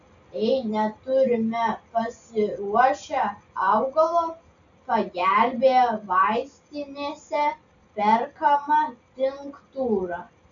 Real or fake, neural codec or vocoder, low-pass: real; none; 7.2 kHz